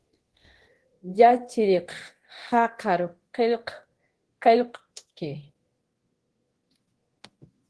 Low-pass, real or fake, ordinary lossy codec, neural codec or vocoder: 10.8 kHz; fake; Opus, 16 kbps; codec, 24 kHz, 0.9 kbps, DualCodec